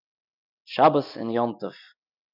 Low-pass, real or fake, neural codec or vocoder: 5.4 kHz; real; none